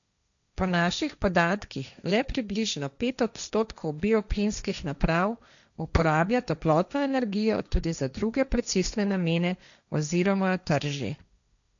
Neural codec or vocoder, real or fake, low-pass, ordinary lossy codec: codec, 16 kHz, 1.1 kbps, Voila-Tokenizer; fake; 7.2 kHz; none